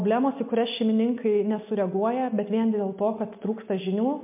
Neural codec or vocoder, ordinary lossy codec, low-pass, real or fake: none; MP3, 32 kbps; 3.6 kHz; real